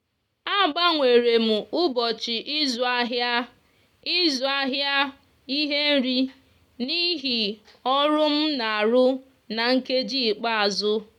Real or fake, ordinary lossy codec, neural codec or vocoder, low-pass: real; none; none; 19.8 kHz